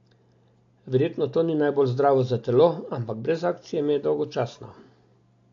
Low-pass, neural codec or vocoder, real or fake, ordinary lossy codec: 7.2 kHz; none; real; AAC, 48 kbps